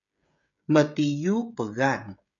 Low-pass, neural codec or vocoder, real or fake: 7.2 kHz; codec, 16 kHz, 16 kbps, FreqCodec, smaller model; fake